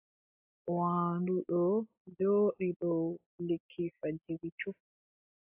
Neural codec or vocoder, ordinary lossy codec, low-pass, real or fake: none; AAC, 32 kbps; 3.6 kHz; real